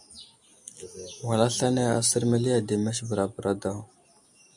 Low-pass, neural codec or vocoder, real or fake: 10.8 kHz; none; real